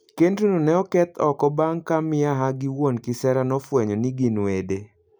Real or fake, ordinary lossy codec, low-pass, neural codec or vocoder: real; none; none; none